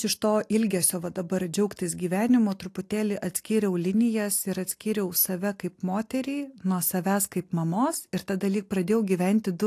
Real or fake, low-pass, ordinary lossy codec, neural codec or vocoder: real; 14.4 kHz; AAC, 64 kbps; none